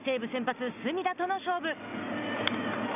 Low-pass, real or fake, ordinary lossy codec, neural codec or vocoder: 3.6 kHz; real; none; none